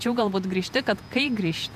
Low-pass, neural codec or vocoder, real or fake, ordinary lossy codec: 14.4 kHz; vocoder, 48 kHz, 128 mel bands, Vocos; fake; MP3, 96 kbps